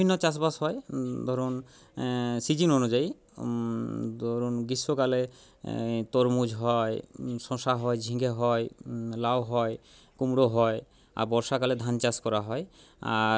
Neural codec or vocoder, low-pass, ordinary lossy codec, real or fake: none; none; none; real